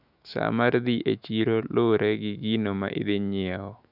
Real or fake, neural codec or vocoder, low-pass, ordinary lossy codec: fake; autoencoder, 48 kHz, 128 numbers a frame, DAC-VAE, trained on Japanese speech; 5.4 kHz; none